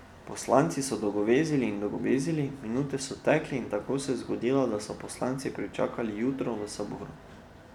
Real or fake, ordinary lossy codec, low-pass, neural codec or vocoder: real; none; 19.8 kHz; none